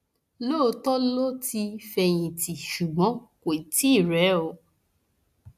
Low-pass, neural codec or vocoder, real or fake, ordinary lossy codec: 14.4 kHz; none; real; AAC, 96 kbps